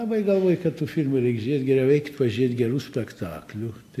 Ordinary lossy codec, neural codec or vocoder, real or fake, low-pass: AAC, 64 kbps; none; real; 14.4 kHz